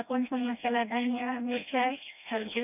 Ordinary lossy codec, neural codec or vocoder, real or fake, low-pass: none; codec, 16 kHz, 1 kbps, FreqCodec, smaller model; fake; 3.6 kHz